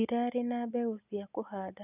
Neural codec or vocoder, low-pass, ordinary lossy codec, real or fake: none; 3.6 kHz; none; real